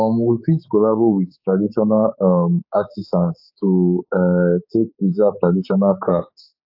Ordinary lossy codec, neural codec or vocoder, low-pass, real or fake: AAC, 48 kbps; codec, 16 kHz, 4 kbps, X-Codec, HuBERT features, trained on general audio; 5.4 kHz; fake